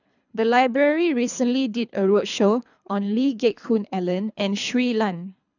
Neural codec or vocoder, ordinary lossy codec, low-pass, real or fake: codec, 24 kHz, 3 kbps, HILCodec; none; 7.2 kHz; fake